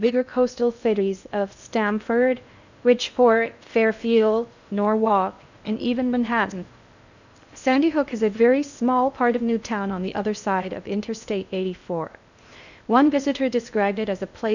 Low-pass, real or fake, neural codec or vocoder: 7.2 kHz; fake; codec, 16 kHz in and 24 kHz out, 0.6 kbps, FocalCodec, streaming, 2048 codes